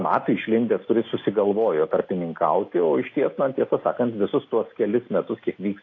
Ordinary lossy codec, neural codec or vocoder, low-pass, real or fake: AAC, 32 kbps; none; 7.2 kHz; real